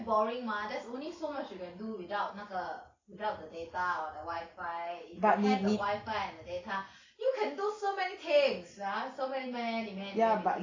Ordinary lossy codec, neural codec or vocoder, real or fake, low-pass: AAC, 32 kbps; none; real; 7.2 kHz